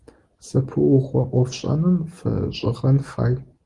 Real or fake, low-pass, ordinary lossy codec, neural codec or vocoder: real; 10.8 kHz; Opus, 24 kbps; none